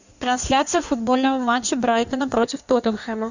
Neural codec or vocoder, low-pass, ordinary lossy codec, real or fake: codec, 16 kHz in and 24 kHz out, 1.1 kbps, FireRedTTS-2 codec; 7.2 kHz; Opus, 64 kbps; fake